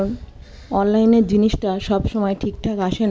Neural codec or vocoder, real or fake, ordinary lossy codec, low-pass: none; real; none; none